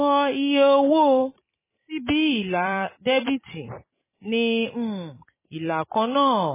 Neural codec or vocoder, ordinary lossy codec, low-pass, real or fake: vocoder, 44.1 kHz, 128 mel bands every 256 samples, BigVGAN v2; MP3, 16 kbps; 3.6 kHz; fake